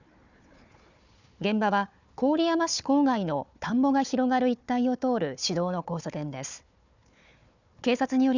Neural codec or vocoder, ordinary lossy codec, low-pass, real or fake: codec, 16 kHz, 4 kbps, FunCodec, trained on Chinese and English, 50 frames a second; none; 7.2 kHz; fake